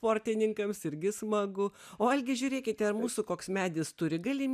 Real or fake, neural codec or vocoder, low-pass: real; none; 14.4 kHz